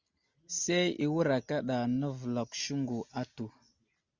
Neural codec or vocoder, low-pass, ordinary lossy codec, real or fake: none; 7.2 kHz; Opus, 64 kbps; real